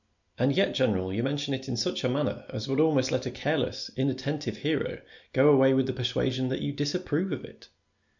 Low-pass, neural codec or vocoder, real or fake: 7.2 kHz; none; real